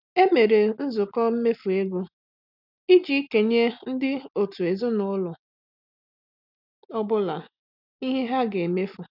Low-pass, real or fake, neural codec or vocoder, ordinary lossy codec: 5.4 kHz; real; none; none